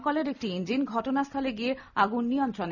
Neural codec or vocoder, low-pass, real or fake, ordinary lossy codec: none; 7.2 kHz; real; none